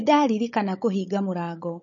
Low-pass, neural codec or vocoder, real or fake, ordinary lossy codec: 7.2 kHz; codec, 16 kHz, 16 kbps, FunCodec, trained on Chinese and English, 50 frames a second; fake; MP3, 32 kbps